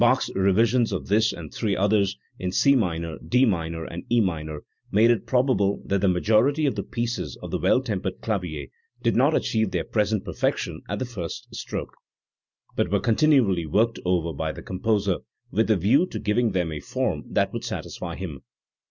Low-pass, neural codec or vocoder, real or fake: 7.2 kHz; none; real